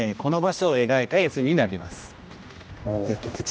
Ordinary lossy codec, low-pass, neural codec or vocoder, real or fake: none; none; codec, 16 kHz, 1 kbps, X-Codec, HuBERT features, trained on general audio; fake